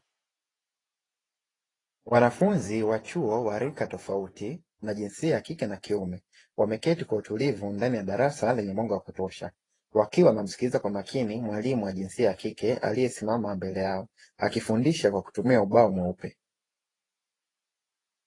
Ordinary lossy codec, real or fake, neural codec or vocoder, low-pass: AAC, 32 kbps; fake; vocoder, 48 kHz, 128 mel bands, Vocos; 10.8 kHz